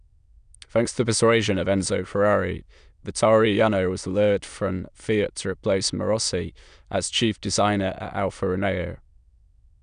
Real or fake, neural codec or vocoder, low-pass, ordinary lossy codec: fake; autoencoder, 22.05 kHz, a latent of 192 numbers a frame, VITS, trained on many speakers; 9.9 kHz; none